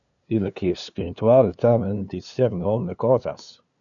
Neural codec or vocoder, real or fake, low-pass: codec, 16 kHz, 2 kbps, FunCodec, trained on LibriTTS, 25 frames a second; fake; 7.2 kHz